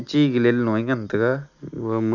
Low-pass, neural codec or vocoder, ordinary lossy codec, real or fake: 7.2 kHz; none; none; real